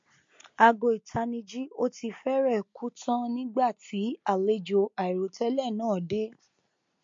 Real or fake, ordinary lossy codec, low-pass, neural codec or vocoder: real; MP3, 48 kbps; 7.2 kHz; none